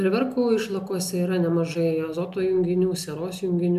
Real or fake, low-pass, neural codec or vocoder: real; 14.4 kHz; none